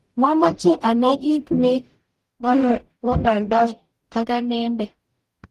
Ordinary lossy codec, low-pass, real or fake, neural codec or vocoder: Opus, 24 kbps; 14.4 kHz; fake; codec, 44.1 kHz, 0.9 kbps, DAC